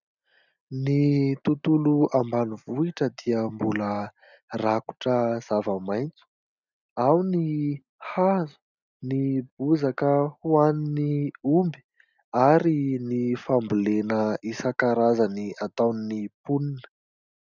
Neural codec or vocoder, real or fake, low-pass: none; real; 7.2 kHz